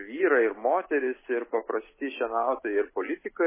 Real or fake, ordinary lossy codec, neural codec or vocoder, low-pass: real; MP3, 16 kbps; none; 3.6 kHz